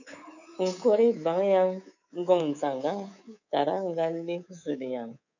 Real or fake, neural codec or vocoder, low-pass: fake; codec, 24 kHz, 3.1 kbps, DualCodec; 7.2 kHz